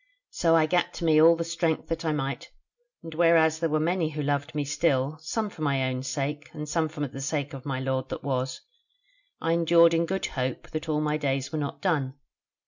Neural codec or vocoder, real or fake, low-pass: none; real; 7.2 kHz